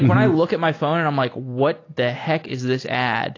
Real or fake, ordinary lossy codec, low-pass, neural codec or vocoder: real; AAC, 32 kbps; 7.2 kHz; none